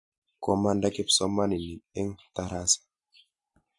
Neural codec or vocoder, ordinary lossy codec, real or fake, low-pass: none; MP3, 48 kbps; real; 10.8 kHz